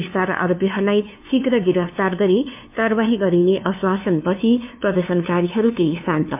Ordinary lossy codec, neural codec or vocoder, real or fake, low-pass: MP3, 32 kbps; codec, 16 kHz, 2 kbps, FunCodec, trained on LibriTTS, 25 frames a second; fake; 3.6 kHz